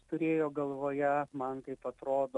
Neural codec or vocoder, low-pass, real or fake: none; 10.8 kHz; real